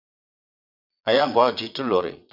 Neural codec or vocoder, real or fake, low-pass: vocoder, 22.05 kHz, 80 mel bands, Vocos; fake; 5.4 kHz